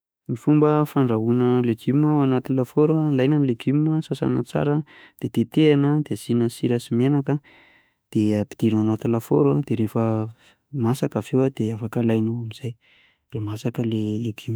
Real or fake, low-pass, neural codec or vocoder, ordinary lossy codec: fake; none; autoencoder, 48 kHz, 32 numbers a frame, DAC-VAE, trained on Japanese speech; none